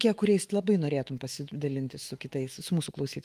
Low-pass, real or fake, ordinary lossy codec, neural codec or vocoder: 14.4 kHz; fake; Opus, 24 kbps; autoencoder, 48 kHz, 128 numbers a frame, DAC-VAE, trained on Japanese speech